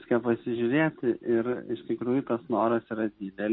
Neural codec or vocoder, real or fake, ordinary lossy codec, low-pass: none; real; MP3, 24 kbps; 7.2 kHz